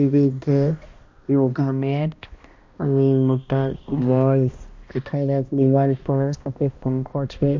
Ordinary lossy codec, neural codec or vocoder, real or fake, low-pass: MP3, 48 kbps; codec, 16 kHz, 1 kbps, X-Codec, HuBERT features, trained on balanced general audio; fake; 7.2 kHz